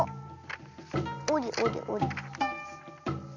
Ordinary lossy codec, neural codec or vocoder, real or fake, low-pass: MP3, 48 kbps; none; real; 7.2 kHz